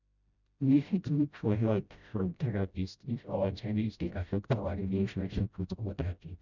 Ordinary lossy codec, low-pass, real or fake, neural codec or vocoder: none; 7.2 kHz; fake; codec, 16 kHz, 0.5 kbps, FreqCodec, smaller model